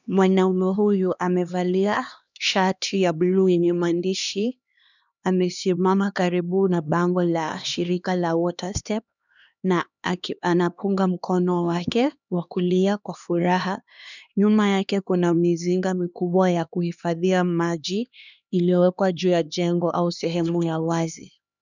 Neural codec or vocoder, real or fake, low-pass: codec, 16 kHz, 2 kbps, X-Codec, HuBERT features, trained on LibriSpeech; fake; 7.2 kHz